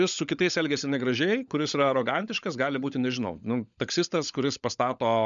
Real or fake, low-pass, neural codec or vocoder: fake; 7.2 kHz; codec, 16 kHz, 16 kbps, FunCodec, trained on LibriTTS, 50 frames a second